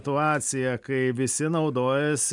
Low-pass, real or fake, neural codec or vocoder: 10.8 kHz; real; none